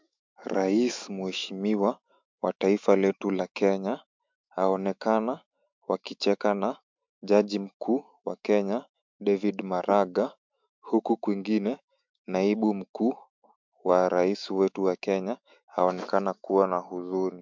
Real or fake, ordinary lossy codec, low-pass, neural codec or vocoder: fake; MP3, 64 kbps; 7.2 kHz; autoencoder, 48 kHz, 128 numbers a frame, DAC-VAE, trained on Japanese speech